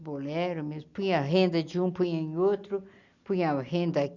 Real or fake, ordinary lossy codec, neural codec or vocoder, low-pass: real; none; none; 7.2 kHz